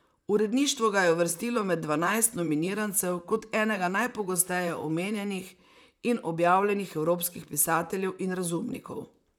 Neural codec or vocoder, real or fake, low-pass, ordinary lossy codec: vocoder, 44.1 kHz, 128 mel bands, Pupu-Vocoder; fake; none; none